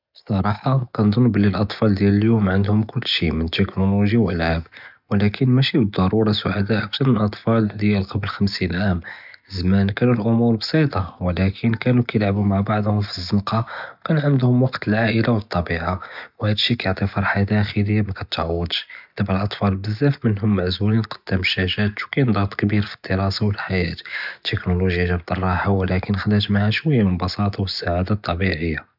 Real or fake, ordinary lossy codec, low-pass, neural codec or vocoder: real; none; 5.4 kHz; none